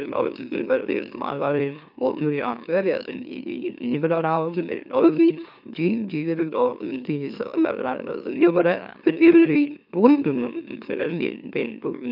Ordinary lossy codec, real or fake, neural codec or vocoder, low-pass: none; fake; autoencoder, 44.1 kHz, a latent of 192 numbers a frame, MeloTTS; 5.4 kHz